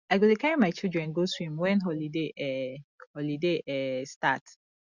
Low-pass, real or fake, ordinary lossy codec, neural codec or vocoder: 7.2 kHz; real; Opus, 64 kbps; none